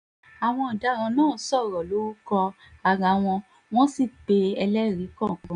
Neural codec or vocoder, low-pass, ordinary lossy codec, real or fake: none; 9.9 kHz; none; real